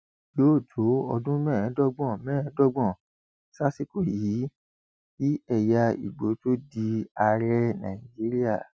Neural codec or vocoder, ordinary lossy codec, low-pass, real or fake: none; none; none; real